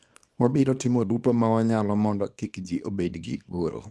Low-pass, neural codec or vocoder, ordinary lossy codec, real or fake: none; codec, 24 kHz, 0.9 kbps, WavTokenizer, small release; none; fake